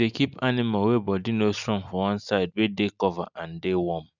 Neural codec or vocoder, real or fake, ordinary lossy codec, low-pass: none; real; none; 7.2 kHz